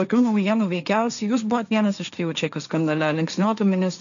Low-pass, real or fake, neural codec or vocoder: 7.2 kHz; fake; codec, 16 kHz, 1.1 kbps, Voila-Tokenizer